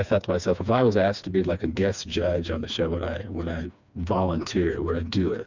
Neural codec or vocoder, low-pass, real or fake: codec, 16 kHz, 2 kbps, FreqCodec, smaller model; 7.2 kHz; fake